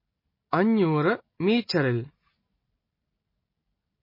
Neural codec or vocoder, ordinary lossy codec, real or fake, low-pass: none; MP3, 24 kbps; real; 5.4 kHz